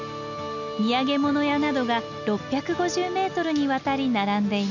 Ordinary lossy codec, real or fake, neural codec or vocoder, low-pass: none; real; none; 7.2 kHz